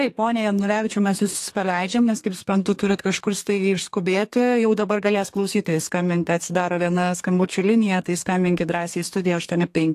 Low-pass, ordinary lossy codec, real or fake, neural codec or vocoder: 14.4 kHz; AAC, 64 kbps; fake; codec, 32 kHz, 1.9 kbps, SNAC